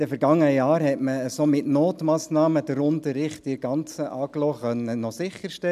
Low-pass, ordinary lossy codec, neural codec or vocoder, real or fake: 14.4 kHz; none; none; real